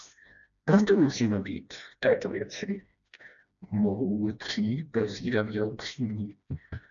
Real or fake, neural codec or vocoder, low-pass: fake; codec, 16 kHz, 1 kbps, FreqCodec, smaller model; 7.2 kHz